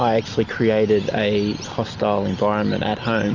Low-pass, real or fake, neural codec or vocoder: 7.2 kHz; real; none